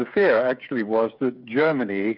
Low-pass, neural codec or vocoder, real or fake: 5.4 kHz; codec, 16 kHz, 8 kbps, FreqCodec, smaller model; fake